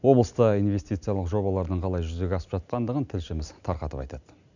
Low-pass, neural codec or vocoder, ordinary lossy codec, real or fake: 7.2 kHz; none; none; real